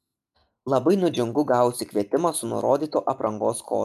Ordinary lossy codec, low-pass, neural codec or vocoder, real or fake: MP3, 96 kbps; 14.4 kHz; none; real